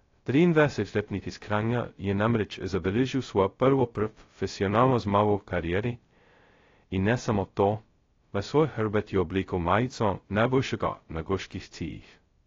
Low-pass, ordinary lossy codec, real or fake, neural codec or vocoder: 7.2 kHz; AAC, 32 kbps; fake; codec, 16 kHz, 0.2 kbps, FocalCodec